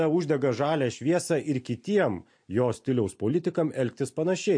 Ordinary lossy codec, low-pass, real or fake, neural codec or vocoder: MP3, 48 kbps; 9.9 kHz; real; none